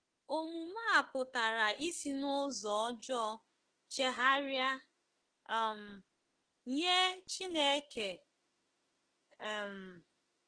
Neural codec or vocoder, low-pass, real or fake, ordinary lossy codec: autoencoder, 48 kHz, 32 numbers a frame, DAC-VAE, trained on Japanese speech; 9.9 kHz; fake; Opus, 16 kbps